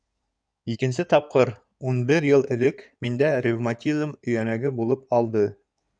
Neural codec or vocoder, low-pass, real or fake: codec, 16 kHz in and 24 kHz out, 2.2 kbps, FireRedTTS-2 codec; 9.9 kHz; fake